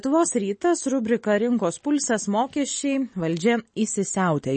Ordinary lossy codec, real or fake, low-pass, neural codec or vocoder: MP3, 32 kbps; real; 9.9 kHz; none